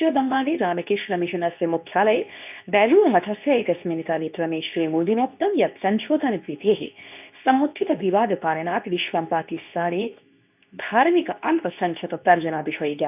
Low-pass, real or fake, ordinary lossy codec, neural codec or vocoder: 3.6 kHz; fake; none; codec, 24 kHz, 0.9 kbps, WavTokenizer, medium speech release version 2